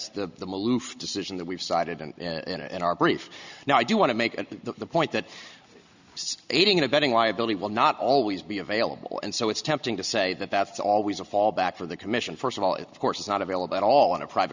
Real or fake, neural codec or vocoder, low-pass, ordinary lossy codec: real; none; 7.2 kHz; Opus, 64 kbps